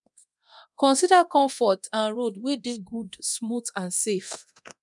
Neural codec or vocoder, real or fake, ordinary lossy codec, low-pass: codec, 24 kHz, 0.9 kbps, DualCodec; fake; none; 10.8 kHz